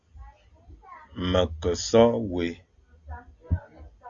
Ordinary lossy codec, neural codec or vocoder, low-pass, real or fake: Opus, 64 kbps; none; 7.2 kHz; real